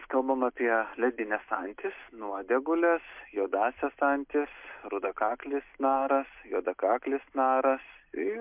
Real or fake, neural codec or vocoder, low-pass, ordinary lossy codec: real; none; 3.6 kHz; MP3, 32 kbps